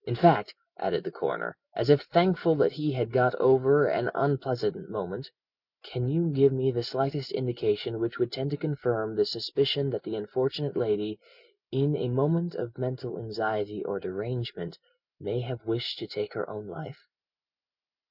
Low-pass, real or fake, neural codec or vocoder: 5.4 kHz; real; none